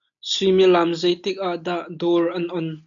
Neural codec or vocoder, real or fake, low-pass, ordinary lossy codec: none; real; 7.2 kHz; AAC, 64 kbps